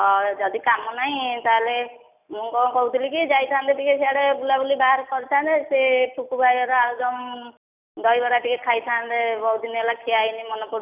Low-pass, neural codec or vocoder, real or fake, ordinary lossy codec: 3.6 kHz; none; real; none